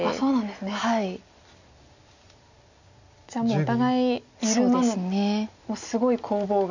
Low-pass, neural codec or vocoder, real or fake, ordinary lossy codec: 7.2 kHz; none; real; none